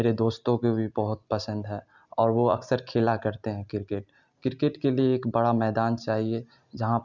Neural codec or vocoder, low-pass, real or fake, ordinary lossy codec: none; 7.2 kHz; real; none